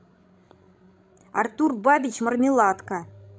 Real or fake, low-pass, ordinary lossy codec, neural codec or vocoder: fake; none; none; codec, 16 kHz, 16 kbps, FreqCodec, larger model